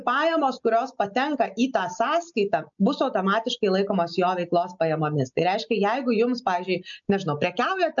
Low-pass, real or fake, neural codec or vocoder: 7.2 kHz; real; none